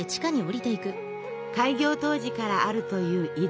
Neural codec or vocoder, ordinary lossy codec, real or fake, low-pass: none; none; real; none